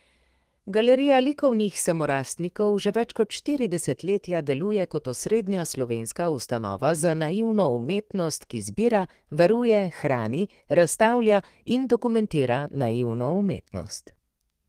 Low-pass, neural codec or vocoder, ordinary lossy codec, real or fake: 14.4 kHz; codec, 32 kHz, 1.9 kbps, SNAC; Opus, 24 kbps; fake